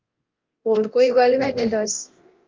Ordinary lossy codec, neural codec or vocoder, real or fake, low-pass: Opus, 32 kbps; codec, 24 kHz, 0.9 kbps, DualCodec; fake; 7.2 kHz